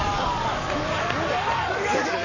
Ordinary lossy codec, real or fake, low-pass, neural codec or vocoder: none; fake; 7.2 kHz; codec, 44.1 kHz, 7.8 kbps, Pupu-Codec